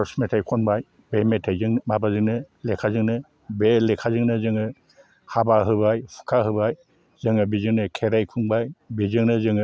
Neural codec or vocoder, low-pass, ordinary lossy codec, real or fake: none; none; none; real